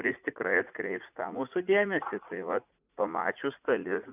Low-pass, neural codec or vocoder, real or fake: 3.6 kHz; vocoder, 44.1 kHz, 80 mel bands, Vocos; fake